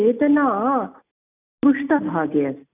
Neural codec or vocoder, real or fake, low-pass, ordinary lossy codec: none; real; 3.6 kHz; none